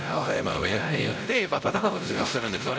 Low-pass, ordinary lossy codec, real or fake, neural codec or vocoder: none; none; fake; codec, 16 kHz, 0.5 kbps, X-Codec, WavLM features, trained on Multilingual LibriSpeech